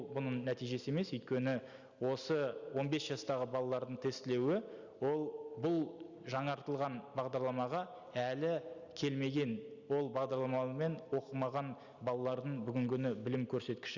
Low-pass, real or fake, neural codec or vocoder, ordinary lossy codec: 7.2 kHz; real; none; Opus, 64 kbps